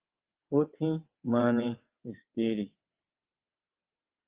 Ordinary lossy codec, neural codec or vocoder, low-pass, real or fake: Opus, 32 kbps; vocoder, 22.05 kHz, 80 mel bands, WaveNeXt; 3.6 kHz; fake